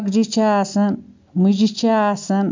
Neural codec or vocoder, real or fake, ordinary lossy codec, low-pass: none; real; MP3, 64 kbps; 7.2 kHz